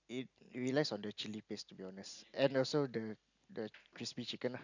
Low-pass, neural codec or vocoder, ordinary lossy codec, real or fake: 7.2 kHz; none; none; real